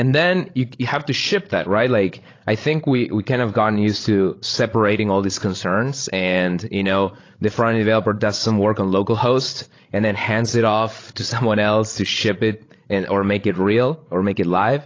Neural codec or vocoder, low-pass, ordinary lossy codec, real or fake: codec, 16 kHz, 16 kbps, FreqCodec, larger model; 7.2 kHz; AAC, 32 kbps; fake